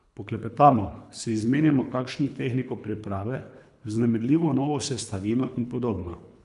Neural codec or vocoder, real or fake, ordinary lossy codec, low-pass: codec, 24 kHz, 3 kbps, HILCodec; fake; none; 10.8 kHz